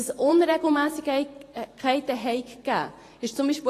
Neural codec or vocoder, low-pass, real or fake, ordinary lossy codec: vocoder, 44.1 kHz, 128 mel bands every 512 samples, BigVGAN v2; 14.4 kHz; fake; AAC, 48 kbps